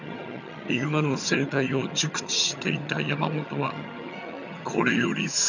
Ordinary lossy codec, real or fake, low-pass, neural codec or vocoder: none; fake; 7.2 kHz; vocoder, 22.05 kHz, 80 mel bands, HiFi-GAN